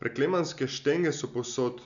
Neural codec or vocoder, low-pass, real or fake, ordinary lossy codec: none; 7.2 kHz; real; none